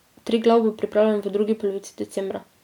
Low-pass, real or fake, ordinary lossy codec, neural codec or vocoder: 19.8 kHz; real; none; none